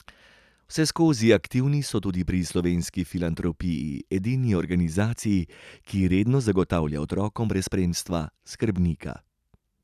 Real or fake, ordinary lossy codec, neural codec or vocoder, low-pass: real; none; none; 14.4 kHz